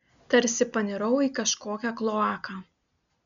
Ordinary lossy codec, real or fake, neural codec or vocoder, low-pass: MP3, 96 kbps; real; none; 7.2 kHz